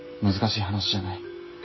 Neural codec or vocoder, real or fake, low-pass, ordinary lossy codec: none; real; 7.2 kHz; MP3, 24 kbps